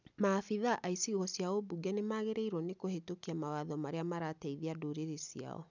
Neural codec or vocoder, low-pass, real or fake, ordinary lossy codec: none; 7.2 kHz; real; none